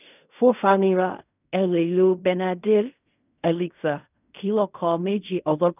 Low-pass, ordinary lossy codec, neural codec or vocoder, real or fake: 3.6 kHz; none; codec, 16 kHz in and 24 kHz out, 0.4 kbps, LongCat-Audio-Codec, fine tuned four codebook decoder; fake